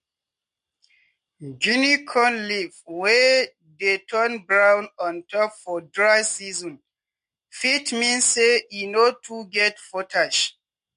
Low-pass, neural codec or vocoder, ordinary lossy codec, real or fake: 14.4 kHz; none; MP3, 48 kbps; real